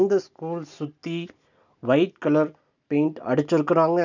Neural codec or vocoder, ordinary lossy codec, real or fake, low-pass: none; none; real; 7.2 kHz